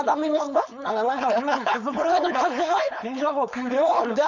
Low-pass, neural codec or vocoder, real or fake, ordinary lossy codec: 7.2 kHz; codec, 16 kHz, 4.8 kbps, FACodec; fake; none